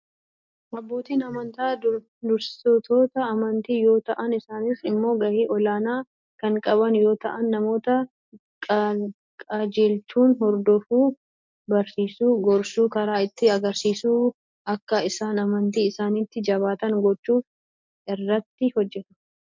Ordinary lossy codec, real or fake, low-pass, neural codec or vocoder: MP3, 64 kbps; real; 7.2 kHz; none